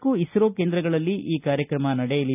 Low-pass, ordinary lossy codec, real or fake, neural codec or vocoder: 3.6 kHz; none; real; none